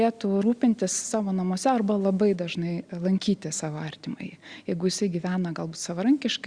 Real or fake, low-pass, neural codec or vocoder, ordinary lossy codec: real; 9.9 kHz; none; Opus, 64 kbps